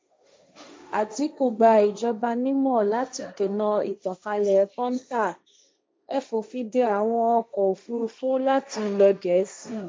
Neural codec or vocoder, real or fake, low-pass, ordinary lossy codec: codec, 16 kHz, 1.1 kbps, Voila-Tokenizer; fake; none; none